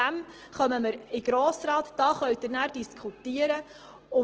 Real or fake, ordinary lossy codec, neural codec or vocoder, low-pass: real; Opus, 16 kbps; none; 7.2 kHz